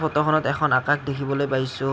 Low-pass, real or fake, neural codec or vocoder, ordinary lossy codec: none; real; none; none